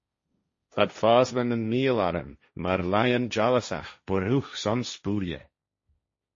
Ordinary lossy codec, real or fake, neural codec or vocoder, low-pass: MP3, 32 kbps; fake; codec, 16 kHz, 1.1 kbps, Voila-Tokenizer; 7.2 kHz